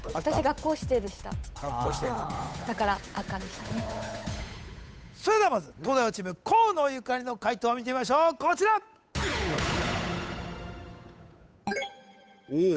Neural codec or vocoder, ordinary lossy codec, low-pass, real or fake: codec, 16 kHz, 8 kbps, FunCodec, trained on Chinese and English, 25 frames a second; none; none; fake